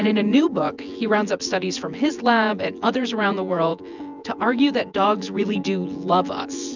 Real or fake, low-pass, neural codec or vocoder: fake; 7.2 kHz; vocoder, 24 kHz, 100 mel bands, Vocos